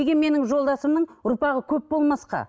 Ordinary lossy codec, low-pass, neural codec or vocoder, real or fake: none; none; none; real